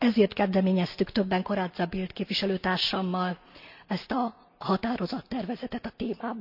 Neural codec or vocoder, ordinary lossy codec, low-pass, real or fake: none; none; 5.4 kHz; real